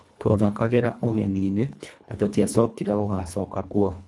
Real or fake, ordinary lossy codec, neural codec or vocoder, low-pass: fake; none; codec, 24 kHz, 1.5 kbps, HILCodec; none